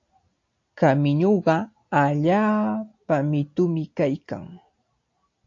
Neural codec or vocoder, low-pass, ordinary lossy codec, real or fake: none; 7.2 kHz; AAC, 48 kbps; real